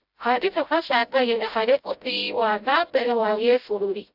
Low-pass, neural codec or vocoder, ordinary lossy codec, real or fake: 5.4 kHz; codec, 16 kHz, 0.5 kbps, FreqCodec, smaller model; AAC, 48 kbps; fake